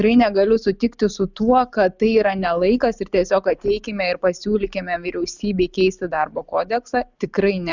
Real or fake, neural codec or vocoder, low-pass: real; none; 7.2 kHz